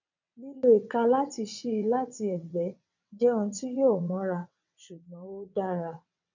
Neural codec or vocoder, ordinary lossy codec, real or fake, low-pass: vocoder, 22.05 kHz, 80 mel bands, WaveNeXt; none; fake; 7.2 kHz